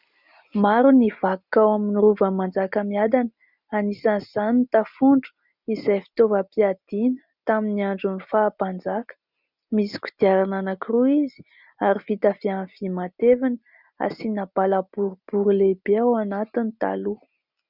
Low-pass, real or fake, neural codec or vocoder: 5.4 kHz; real; none